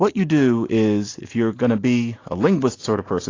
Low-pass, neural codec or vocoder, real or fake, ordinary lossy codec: 7.2 kHz; none; real; AAC, 32 kbps